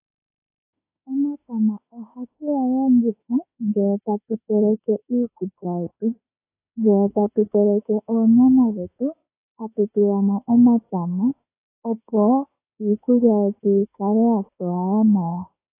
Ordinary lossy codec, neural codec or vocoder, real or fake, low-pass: AAC, 24 kbps; autoencoder, 48 kHz, 32 numbers a frame, DAC-VAE, trained on Japanese speech; fake; 3.6 kHz